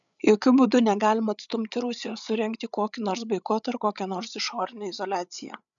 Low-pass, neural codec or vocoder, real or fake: 7.2 kHz; codec, 16 kHz, 8 kbps, FreqCodec, larger model; fake